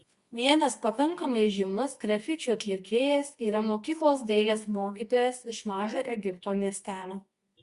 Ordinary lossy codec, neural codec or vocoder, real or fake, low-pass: Opus, 64 kbps; codec, 24 kHz, 0.9 kbps, WavTokenizer, medium music audio release; fake; 10.8 kHz